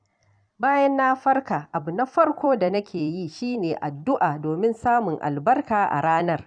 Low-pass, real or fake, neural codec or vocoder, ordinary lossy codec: 9.9 kHz; fake; vocoder, 44.1 kHz, 128 mel bands every 256 samples, BigVGAN v2; none